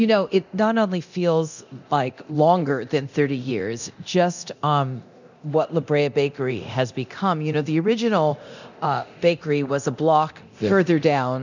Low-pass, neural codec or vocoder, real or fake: 7.2 kHz; codec, 24 kHz, 0.9 kbps, DualCodec; fake